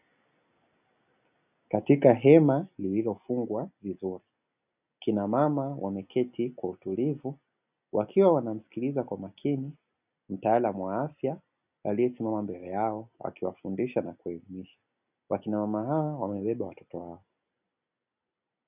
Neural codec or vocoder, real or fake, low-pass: none; real; 3.6 kHz